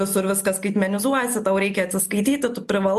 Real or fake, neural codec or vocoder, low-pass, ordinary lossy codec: real; none; 14.4 kHz; MP3, 64 kbps